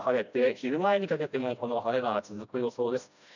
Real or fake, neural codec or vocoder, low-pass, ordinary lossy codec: fake; codec, 16 kHz, 1 kbps, FreqCodec, smaller model; 7.2 kHz; none